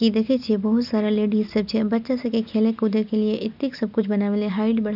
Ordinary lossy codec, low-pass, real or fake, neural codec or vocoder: none; 5.4 kHz; real; none